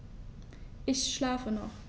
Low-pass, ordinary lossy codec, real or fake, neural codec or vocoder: none; none; real; none